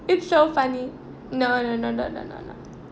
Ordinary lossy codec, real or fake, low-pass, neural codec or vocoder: none; real; none; none